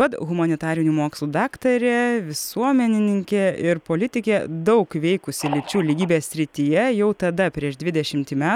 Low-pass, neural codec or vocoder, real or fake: 19.8 kHz; none; real